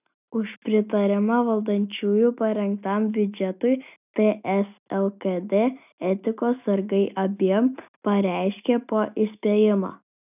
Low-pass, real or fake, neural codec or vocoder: 3.6 kHz; real; none